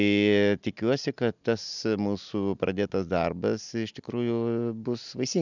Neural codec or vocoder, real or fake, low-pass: none; real; 7.2 kHz